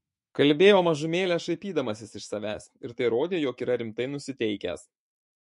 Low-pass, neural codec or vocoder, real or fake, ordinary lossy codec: 14.4 kHz; autoencoder, 48 kHz, 128 numbers a frame, DAC-VAE, trained on Japanese speech; fake; MP3, 48 kbps